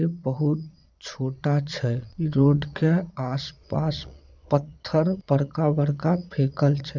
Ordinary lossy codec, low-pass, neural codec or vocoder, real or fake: none; 7.2 kHz; vocoder, 22.05 kHz, 80 mel bands, Vocos; fake